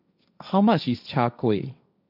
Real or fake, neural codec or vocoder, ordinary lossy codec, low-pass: fake; codec, 16 kHz, 1.1 kbps, Voila-Tokenizer; none; 5.4 kHz